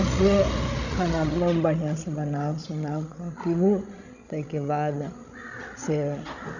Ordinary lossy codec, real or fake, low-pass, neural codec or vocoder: none; fake; 7.2 kHz; codec, 16 kHz, 16 kbps, FreqCodec, larger model